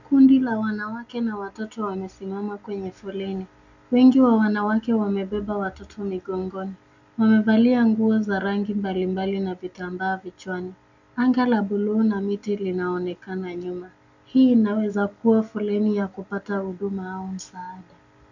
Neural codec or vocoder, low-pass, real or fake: none; 7.2 kHz; real